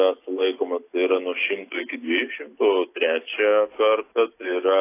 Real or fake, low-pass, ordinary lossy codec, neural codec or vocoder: real; 3.6 kHz; AAC, 24 kbps; none